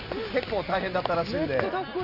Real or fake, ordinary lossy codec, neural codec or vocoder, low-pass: real; none; none; 5.4 kHz